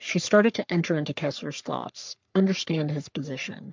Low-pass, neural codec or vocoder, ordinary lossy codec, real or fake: 7.2 kHz; codec, 44.1 kHz, 3.4 kbps, Pupu-Codec; MP3, 64 kbps; fake